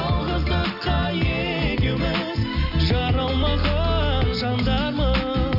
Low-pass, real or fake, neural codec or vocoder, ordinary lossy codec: 5.4 kHz; real; none; AAC, 48 kbps